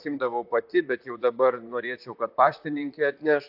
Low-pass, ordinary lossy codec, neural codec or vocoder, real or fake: 5.4 kHz; AAC, 48 kbps; vocoder, 44.1 kHz, 128 mel bands, Pupu-Vocoder; fake